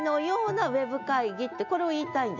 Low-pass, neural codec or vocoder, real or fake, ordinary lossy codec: 7.2 kHz; none; real; none